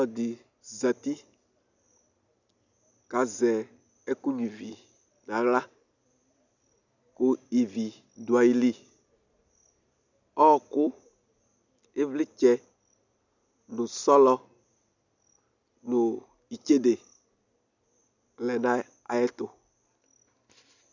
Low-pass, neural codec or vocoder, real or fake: 7.2 kHz; none; real